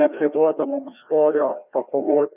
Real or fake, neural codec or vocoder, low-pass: fake; codec, 16 kHz, 1 kbps, FreqCodec, larger model; 3.6 kHz